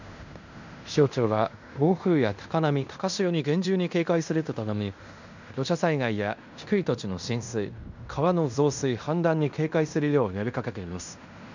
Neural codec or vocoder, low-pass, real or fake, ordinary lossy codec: codec, 16 kHz in and 24 kHz out, 0.9 kbps, LongCat-Audio-Codec, fine tuned four codebook decoder; 7.2 kHz; fake; none